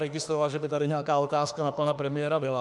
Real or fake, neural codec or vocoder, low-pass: fake; autoencoder, 48 kHz, 32 numbers a frame, DAC-VAE, trained on Japanese speech; 10.8 kHz